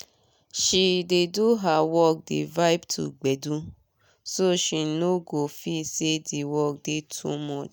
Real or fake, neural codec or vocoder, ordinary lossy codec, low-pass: real; none; none; none